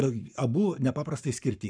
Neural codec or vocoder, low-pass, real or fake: codec, 44.1 kHz, 7.8 kbps, Pupu-Codec; 9.9 kHz; fake